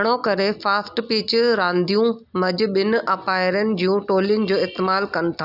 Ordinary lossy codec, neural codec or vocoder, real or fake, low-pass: none; none; real; 5.4 kHz